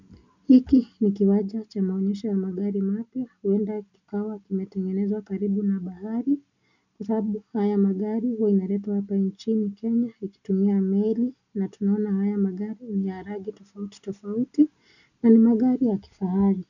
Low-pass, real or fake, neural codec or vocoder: 7.2 kHz; real; none